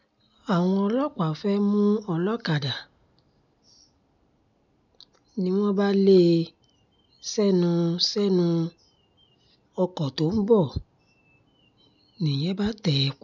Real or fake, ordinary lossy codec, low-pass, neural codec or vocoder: real; none; 7.2 kHz; none